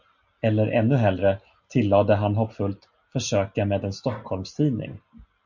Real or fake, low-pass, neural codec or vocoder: real; 7.2 kHz; none